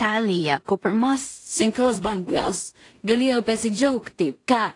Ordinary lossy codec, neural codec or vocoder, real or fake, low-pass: AAC, 48 kbps; codec, 16 kHz in and 24 kHz out, 0.4 kbps, LongCat-Audio-Codec, two codebook decoder; fake; 10.8 kHz